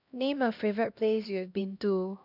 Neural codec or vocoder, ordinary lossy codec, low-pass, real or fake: codec, 16 kHz, 1 kbps, X-Codec, HuBERT features, trained on LibriSpeech; none; 5.4 kHz; fake